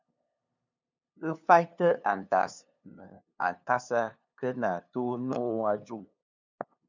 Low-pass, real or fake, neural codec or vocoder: 7.2 kHz; fake; codec, 16 kHz, 2 kbps, FunCodec, trained on LibriTTS, 25 frames a second